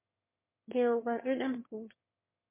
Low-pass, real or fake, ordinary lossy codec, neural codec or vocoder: 3.6 kHz; fake; MP3, 24 kbps; autoencoder, 22.05 kHz, a latent of 192 numbers a frame, VITS, trained on one speaker